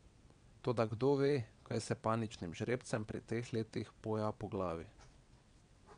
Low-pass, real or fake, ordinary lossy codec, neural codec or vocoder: 9.9 kHz; fake; none; vocoder, 22.05 kHz, 80 mel bands, WaveNeXt